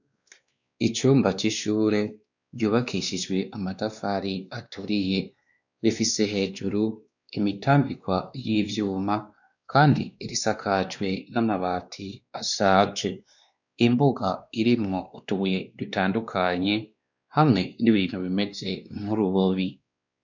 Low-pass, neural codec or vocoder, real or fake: 7.2 kHz; codec, 16 kHz, 2 kbps, X-Codec, WavLM features, trained on Multilingual LibriSpeech; fake